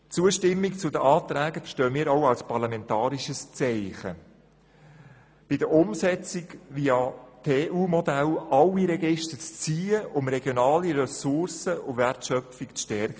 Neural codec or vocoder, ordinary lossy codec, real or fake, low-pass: none; none; real; none